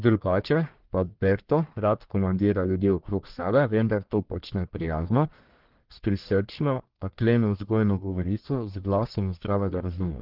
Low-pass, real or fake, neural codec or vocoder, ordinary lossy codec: 5.4 kHz; fake; codec, 44.1 kHz, 1.7 kbps, Pupu-Codec; Opus, 16 kbps